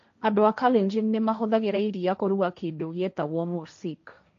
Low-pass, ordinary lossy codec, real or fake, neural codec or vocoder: 7.2 kHz; MP3, 48 kbps; fake; codec, 16 kHz, 1.1 kbps, Voila-Tokenizer